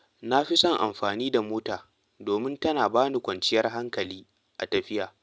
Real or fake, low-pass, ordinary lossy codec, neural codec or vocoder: real; none; none; none